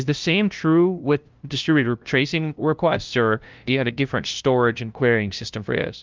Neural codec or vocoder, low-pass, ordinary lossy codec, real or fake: codec, 16 kHz, 0.5 kbps, FunCodec, trained on LibriTTS, 25 frames a second; 7.2 kHz; Opus, 24 kbps; fake